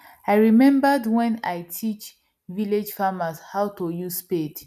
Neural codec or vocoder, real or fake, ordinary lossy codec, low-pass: none; real; none; 14.4 kHz